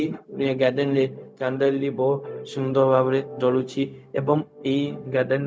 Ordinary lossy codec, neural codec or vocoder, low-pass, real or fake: none; codec, 16 kHz, 0.4 kbps, LongCat-Audio-Codec; none; fake